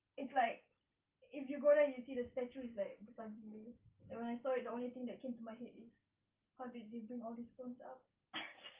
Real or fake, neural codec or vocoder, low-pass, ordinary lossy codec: real; none; 3.6 kHz; Opus, 24 kbps